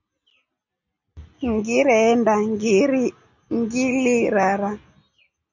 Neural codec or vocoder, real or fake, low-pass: none; real; 7.2 kHz